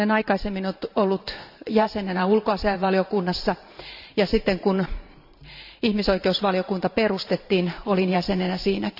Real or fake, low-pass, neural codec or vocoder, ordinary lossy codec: fake; 5.4 kHz; vocoder, 44.1 kHz, 128 mel bands every 512 samples, BigVGAN v2; none